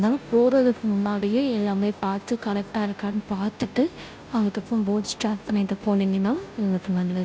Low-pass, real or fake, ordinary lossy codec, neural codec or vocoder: none; fake; none; codec, 16 kHz, 0.5 kbps, FunCodec, trained on Chinese and English, 25 frames a second